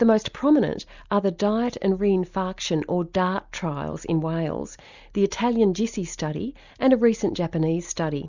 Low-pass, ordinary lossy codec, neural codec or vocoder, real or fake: 7.2 kHz; Opus, 64 kbps; none; real